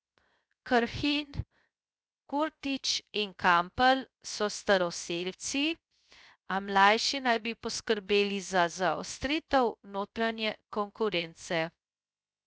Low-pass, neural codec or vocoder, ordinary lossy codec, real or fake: none; codec, 16 kHz, 0.3 kbps, FocalCodec; none; fake